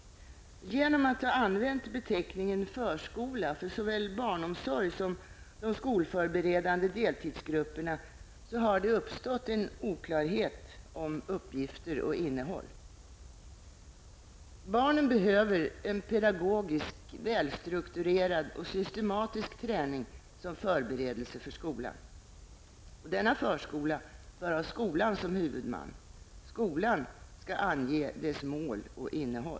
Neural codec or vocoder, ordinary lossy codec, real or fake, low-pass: none; none; real; none